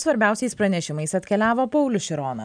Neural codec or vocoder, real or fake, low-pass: none; real; 9.9 kHz